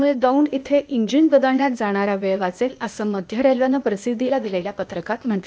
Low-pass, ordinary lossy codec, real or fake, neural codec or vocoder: none; none; fake; codec, 16 kHz, 0.8 kbps, ZipCodec